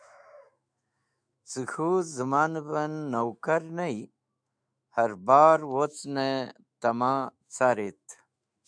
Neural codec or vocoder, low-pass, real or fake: autoencoder, 48 kHz, 128 numbers a frame, DAC-VAE, trained on Japanese speech; 9.9 kHz; fake